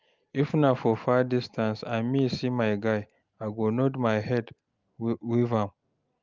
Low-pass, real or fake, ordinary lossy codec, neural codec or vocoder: 7.2 kHz; real; Opus, 24 kbps; none